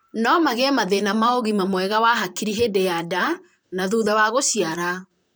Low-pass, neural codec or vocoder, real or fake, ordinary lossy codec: none; vocoder, 44.1 kHz, 128 mel bands, Pupu-Vocoder; fake; none